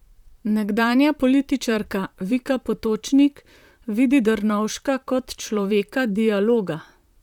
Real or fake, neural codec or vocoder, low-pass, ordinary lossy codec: fake; vocoder, 44.1 kHz, 128 mel bands, Pupu-Vocoder; 19.8 kHz; none